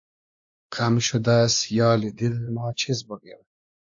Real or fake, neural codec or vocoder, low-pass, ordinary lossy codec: fake; codec, 16 kHz, 2 kbps, X-Codec, WavLM features, trained on Multilingual LibriSpeech; 7.2 kHz; AAC, 64 kbps